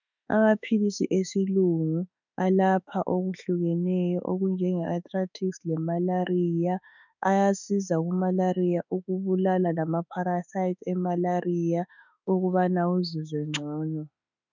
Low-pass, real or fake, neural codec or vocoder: 7.2 kHz; fake; autoencoder, 48 kHz, 32 numbers a frame, DAC-VAE, trained on Japanese speech